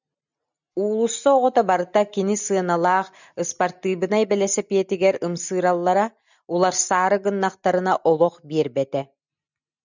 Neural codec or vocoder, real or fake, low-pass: none; real; 7.2 kHz